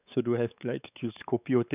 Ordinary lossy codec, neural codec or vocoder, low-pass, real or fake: none; codec, 16 kHz, 4 kbps, X-Codec, WavLM features, trained on Multilingual LibriSpeech; 3.6 kHz; fake